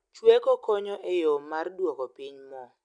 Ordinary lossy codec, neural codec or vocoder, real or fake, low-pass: none; none; real; 9.9 kHz